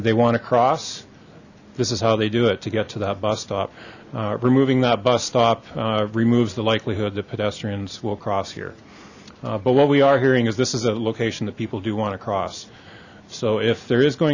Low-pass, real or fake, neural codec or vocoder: 7.2 kHz; real; none